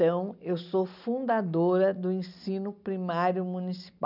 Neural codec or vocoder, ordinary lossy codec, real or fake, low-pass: none; none; real; 5.4 kHz